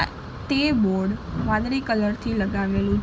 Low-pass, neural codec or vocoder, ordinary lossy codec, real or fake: none; none; none; real